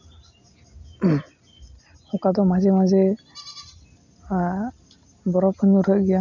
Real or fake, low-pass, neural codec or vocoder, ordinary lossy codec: real; 7.2 kHz; none; none